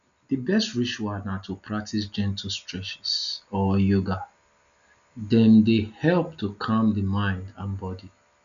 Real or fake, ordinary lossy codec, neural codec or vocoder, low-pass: real; none; none; 7.2 kHz